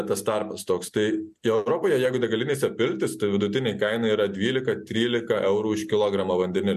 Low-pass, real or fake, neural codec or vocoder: 14.4 kHz; real; none